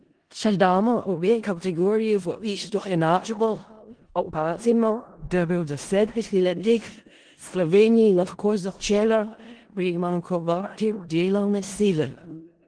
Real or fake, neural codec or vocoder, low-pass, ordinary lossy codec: fake; codec, 16 kHz in and 24 kHz out, 0.4 kbps, LongCat-Audio-Codec, four codebook decoder; 9.9 kHz; Opus, 16 kbps